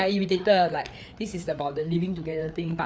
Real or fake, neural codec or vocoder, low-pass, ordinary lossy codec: fake; codec, 16 kHz, 4 kbps, FreqCodec, larger model; none; none